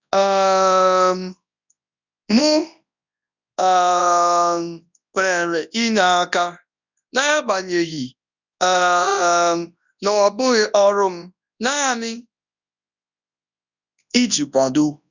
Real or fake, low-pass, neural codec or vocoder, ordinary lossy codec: fake; 7.2 kHz; codec, 24 kHz, 0.9 kbps, WavTokenizer, large speech release; none